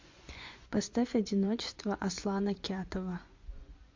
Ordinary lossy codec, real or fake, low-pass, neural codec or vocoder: MP3, 48 kbps; fake; 7.2 kHz; vocoder, 24 kHz, 100 mel bands, Vocos